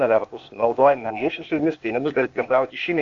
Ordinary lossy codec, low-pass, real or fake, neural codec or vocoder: MP3, 64 kbps; 7.2 kHz; fake; codec, 16 kHz, 0.8 kbps, ZipCodec